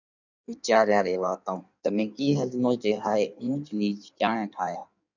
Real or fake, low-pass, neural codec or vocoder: fake; 7.2 kHz; codec, 16 kHz in and 24 kHz out, 1.1 kbps, FireRedTTS-2 codec